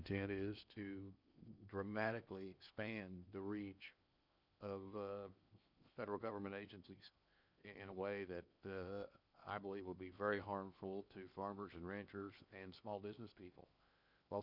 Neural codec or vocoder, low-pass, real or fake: codec, 16 kHz in and 24 kHz out, 0.8 kbps, FocalCodec, streaming, 65536 codes; 5.4 kHz; fake